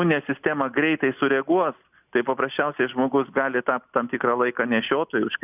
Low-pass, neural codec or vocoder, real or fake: 3.6 kHz; none; real